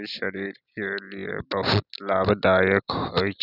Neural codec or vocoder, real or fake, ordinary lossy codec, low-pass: none; real; none; 5.4 kHz